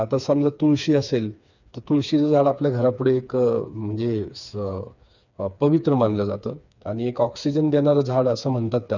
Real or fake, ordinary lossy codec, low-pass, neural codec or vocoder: fake; none; 7.2 kHz; codec, 16 kHz, 4 kbps, FreqCodec, smaller model